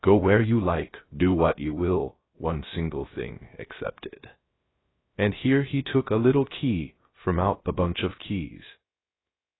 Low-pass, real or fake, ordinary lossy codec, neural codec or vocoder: 7.2 kHz; fake; AAC, 16 kbps; codec, 16 kHz, 0.3 kbps, FocalCodec